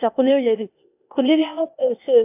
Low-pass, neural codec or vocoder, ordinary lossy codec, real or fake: 3.6 kHz; codec, 16 kHz, 0.8 kbps, ZipCodec; AAC, 24 kbps; fake